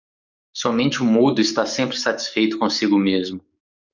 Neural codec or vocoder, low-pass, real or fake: codec, 44.1 kHz, 7.8 kbps, DAC; 7.2 kHz; fake